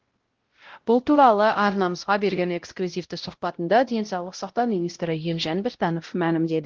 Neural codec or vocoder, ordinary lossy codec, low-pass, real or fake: codec, 16 kHz, 0.5 kbps, X-Codec, WavLM features, trained on Multilingual LibriSpeech; Opus, 16 kbps; 7.2 kHz; fake